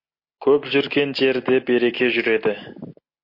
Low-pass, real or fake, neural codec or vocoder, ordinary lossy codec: 5.4 kHz; real; none; MP3, 32 kbps